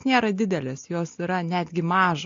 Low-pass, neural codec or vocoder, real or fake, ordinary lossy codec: 7.2 kHz; none; real; AAC, 48 kbps